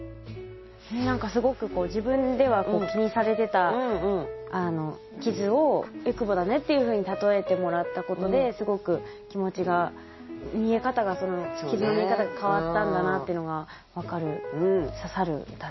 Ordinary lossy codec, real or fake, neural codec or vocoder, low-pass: MP3, 24 kbps; real; none; 7.2 kHz